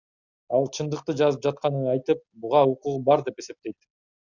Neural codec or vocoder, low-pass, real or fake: none; 7.2 kHz; real